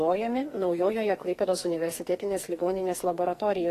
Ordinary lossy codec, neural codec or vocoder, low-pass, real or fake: AAC, 48 kbps; codec, 44.1 kHz, 2.6 kbps, SNAC; 14.4 kHz; fake